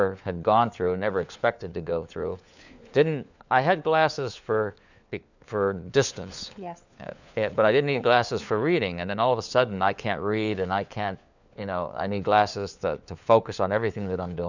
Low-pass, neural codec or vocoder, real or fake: 7.2 kHz; codec, 16 kHz, 4 kbps, FunCodec, trained on LibriTTS, 50 frames a second; fake